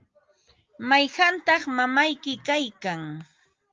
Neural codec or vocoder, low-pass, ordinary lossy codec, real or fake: none; 7.2 kHz; Opus, 32 kbps; real